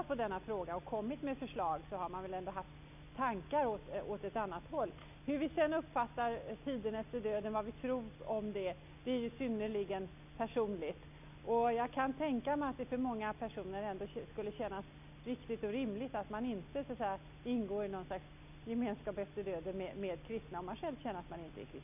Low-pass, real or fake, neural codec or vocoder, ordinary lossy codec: 3.6 kHz; real; none; none